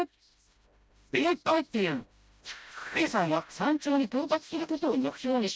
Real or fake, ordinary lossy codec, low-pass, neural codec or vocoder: fake; none; none; codec, 16 kHz, 0.5 kbps, FreqCodec, smaller model